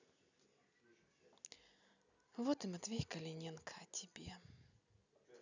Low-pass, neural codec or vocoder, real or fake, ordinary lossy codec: 7.2 kHz; none; real; none